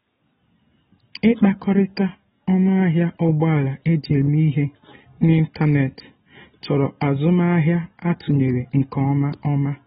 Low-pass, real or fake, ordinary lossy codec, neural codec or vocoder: 7.2 kHz; real; AAC, 16 kbps; none